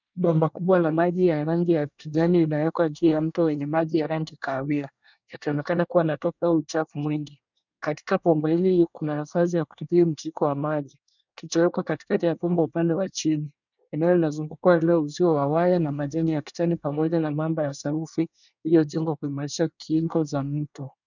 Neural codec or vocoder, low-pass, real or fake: codec, 24 kHz, 1 kbps, SNAC; 7.2 kHz; fake